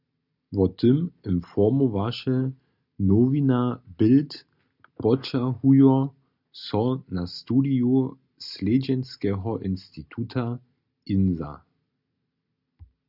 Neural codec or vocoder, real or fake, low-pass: none; real; 5.4 kHz